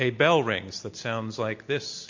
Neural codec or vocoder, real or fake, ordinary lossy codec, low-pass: none; real; MP3, 48 kbps; 7.2 kHz